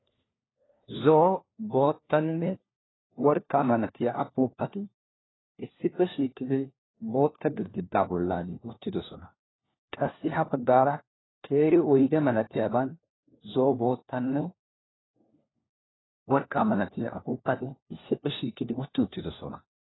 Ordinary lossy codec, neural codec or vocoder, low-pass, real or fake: AAC, 16 kbps; codec, 16 kHz, 1 kbps, FunCodec, trained on LibriTTS, 50 frames a second; 7.2 kHz; fake